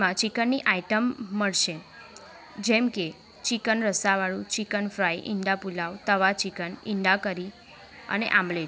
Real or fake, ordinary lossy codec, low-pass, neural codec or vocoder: real; none; none; none